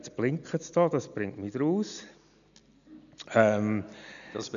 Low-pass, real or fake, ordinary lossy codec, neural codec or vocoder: 7.2 kHz; real; none; none